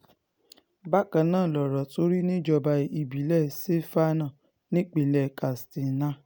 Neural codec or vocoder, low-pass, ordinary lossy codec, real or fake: none; none; none; real